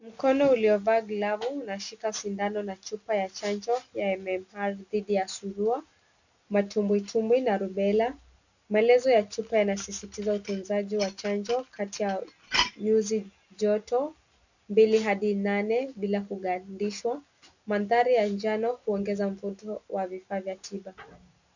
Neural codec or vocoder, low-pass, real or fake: none; 7.2 kHz; real